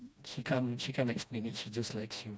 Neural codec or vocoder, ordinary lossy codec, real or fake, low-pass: codec, 16 kHz, 1 kbps, FreqCodec, smaller model; none; fake; none